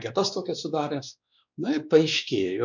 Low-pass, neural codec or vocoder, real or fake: 7.2 kHz; codec, 16 kHz, 2 kbps, X-Codec, WavLM features, trained on Multilingual LibriSpeech; fake